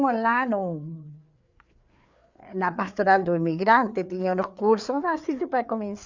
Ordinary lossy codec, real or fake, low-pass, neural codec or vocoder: Opus, 64 kbps; fake; 7.2 kHz; codec, 16 kHz, 4 kbps, FreqCodec, larger model